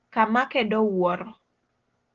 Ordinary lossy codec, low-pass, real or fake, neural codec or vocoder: Opus, 16 kbps; 7.2 kHz; real; none